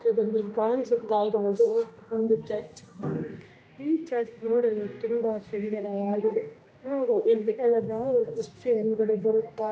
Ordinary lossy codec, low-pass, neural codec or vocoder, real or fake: none; none; codec, 16 kHz, 1 kbps, X-Codec, HuBERT features, trained on general audio; fake